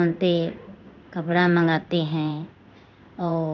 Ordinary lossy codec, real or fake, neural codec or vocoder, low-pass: none; fake; codec, 16 kHz in and 24 kHz out, 1 kbps, XY-Tokenizer; 7.2 kHz